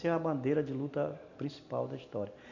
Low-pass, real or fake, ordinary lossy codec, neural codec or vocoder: 7.2 kHz; real; none; none